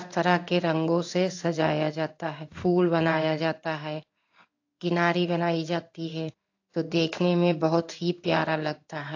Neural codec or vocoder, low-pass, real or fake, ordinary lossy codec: codec, 16 kHz in and 24 kHz out, 1 kbps, XY-Tokenizer; 7.2 kHz; fake; AAC, 48 kbps